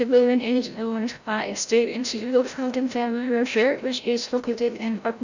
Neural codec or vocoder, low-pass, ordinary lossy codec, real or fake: codec, 16 kHz, 0.5 kbps, FreqCodec, larger model; 7.2 kHz; none; fake